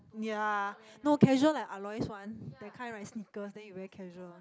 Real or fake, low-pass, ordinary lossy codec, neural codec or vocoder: real; none; none; none